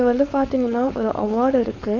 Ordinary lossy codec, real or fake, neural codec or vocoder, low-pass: none; fake; codec, 16 kHz, 4.8 kbps, FACodec; 7.2 kHz